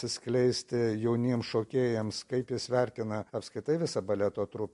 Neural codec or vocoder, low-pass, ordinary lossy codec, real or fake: none; 14.4 kHz; MP3, 48 kbps; real